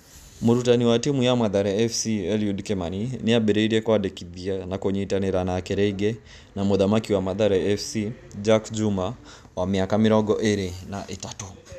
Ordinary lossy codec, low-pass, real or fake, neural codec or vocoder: none; 14.4 kHz; real; none